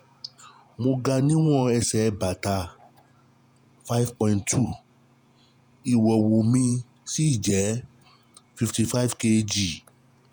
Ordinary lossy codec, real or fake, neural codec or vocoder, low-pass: none; fake; vocoder, 48 kHz, 128 mel bands, Vocos; none